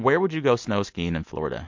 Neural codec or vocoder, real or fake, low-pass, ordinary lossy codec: none; real; 7.2 kHz; MP3, 48 kbps